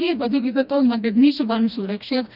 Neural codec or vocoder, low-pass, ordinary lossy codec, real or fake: codec, 16 kHz, 1 kbps, FreqCodec, smaller model; 5.4 kHz; none; fake